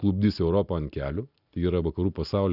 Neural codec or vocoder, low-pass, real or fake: none; 5.4 kHz; real